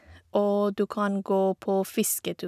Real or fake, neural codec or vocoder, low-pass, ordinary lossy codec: real; none; 14.4 kHz; none